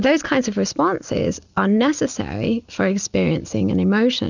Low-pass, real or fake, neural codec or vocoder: 7.2 kHz; real; none